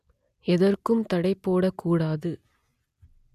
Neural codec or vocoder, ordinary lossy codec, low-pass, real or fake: none; none; 14.4 kHz; real